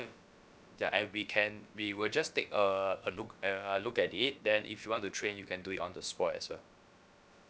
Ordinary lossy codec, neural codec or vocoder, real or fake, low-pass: none; codec, 16 kHz, about 1 kbps, DyCAST, with the encoder's durations; fake; none